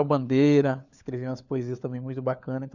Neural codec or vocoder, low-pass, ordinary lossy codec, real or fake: codec, 16 kHz, 4 kbps, FunCodec, trained on LibriTTS, 50 frames a second; 7.2 kHz; none; fake